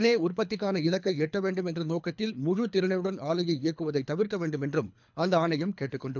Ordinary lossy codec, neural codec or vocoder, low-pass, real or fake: none; codec, 24 kHz, 3 kbps, HILCodec; 7.2 kHz; fake